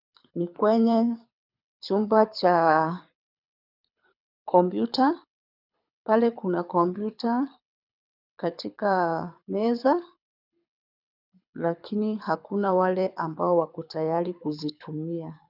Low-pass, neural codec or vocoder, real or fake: 5.4 kHz; codec, 24 kHz, 6 kbps, HILCodec; fake